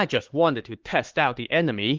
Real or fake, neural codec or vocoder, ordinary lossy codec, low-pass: fake; codec, 16 kHz, 4 kbps, X-Codec, HuBERT features, trained on LibriSpeech; Opus, 16 kbps; 7.2 kHz